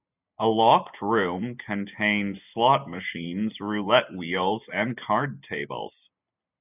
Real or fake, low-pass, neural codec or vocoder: real; 3.6 kHz; none